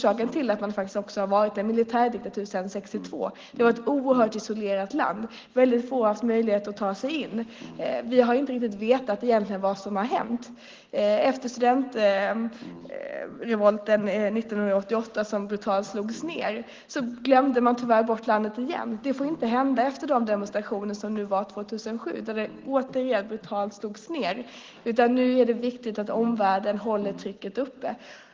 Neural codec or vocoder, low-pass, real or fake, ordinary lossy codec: none; 7.2 kHz; real; Opus, 16 kbps